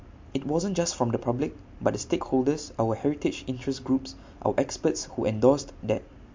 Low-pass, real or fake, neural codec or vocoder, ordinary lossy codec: 7.2 kHz; real; none; MP3, 48 kbps